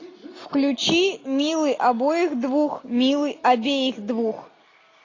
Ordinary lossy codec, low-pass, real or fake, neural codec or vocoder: AAC, 32 kbps; 7.2 kHz; real; none